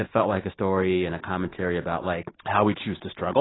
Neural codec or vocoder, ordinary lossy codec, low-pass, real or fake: none; AAC, 16 kbps; 7.2 kHz; real